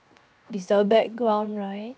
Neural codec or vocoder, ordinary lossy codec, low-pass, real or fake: codec, 16 kHz, 0.7 kbps, FocalCodec; none; none; fake